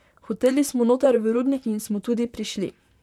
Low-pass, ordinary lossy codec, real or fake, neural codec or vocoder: 19.8 kHz; none; fake; vocoder, 44.1 kHz, 128 mel bands, Pupu-Vocoder